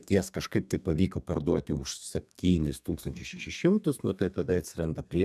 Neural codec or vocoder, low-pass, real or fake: codec, 32 kHz, 1.9 kbps, SNAC; 14.4 kHz; fake